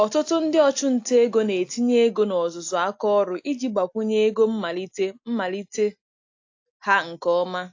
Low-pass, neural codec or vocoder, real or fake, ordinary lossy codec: 7.2 kHz; none; real; AAC, 48 kbps